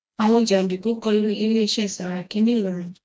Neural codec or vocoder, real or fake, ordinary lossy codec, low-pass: codec, 16 kHz, 1 kbps, FreqCodec, smaller model; fake; none; none